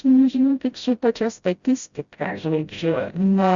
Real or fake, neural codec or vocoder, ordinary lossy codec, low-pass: fake; codec, 16 kHz, 0.5 kbps, FreqCodec, smaller model; Opus, 64 kbps; 7.2 kHz